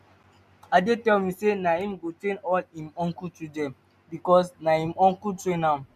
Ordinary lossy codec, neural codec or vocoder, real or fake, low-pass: none; none; real; 14.4 kHz